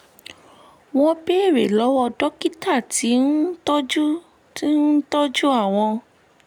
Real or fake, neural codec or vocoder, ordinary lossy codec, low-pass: real; none; none; 19.8 kHz